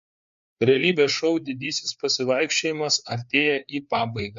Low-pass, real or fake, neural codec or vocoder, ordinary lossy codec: 7.2 kHz; fake; codec, 16 kHz, 4 kbps, FreqCodec, larger model; MP3, 48 kbps